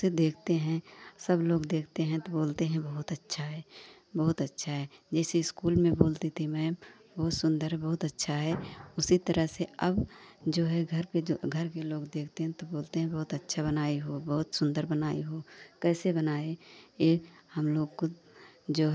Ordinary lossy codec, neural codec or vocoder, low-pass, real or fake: none; none; none; real